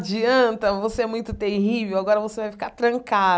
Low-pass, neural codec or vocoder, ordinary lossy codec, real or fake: none; none; none; real